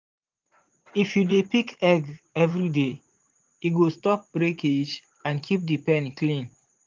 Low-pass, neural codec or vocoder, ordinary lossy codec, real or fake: 7.2 kHz; vocoder, 44.1 kHz, 80 mel bands, Vocos; Opus, 32 kbps; fake